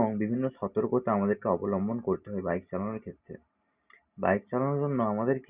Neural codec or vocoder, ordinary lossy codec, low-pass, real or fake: none; Opus, 64 kbps; 3.6 kHz; real